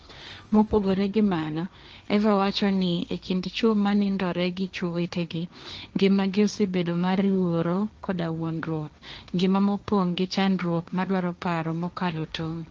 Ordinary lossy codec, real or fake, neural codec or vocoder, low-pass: Opus, 24 kbps; fake; codec, 16 kHz, 1.1 kbps, Voila-Tokenizer; 7.2 kHz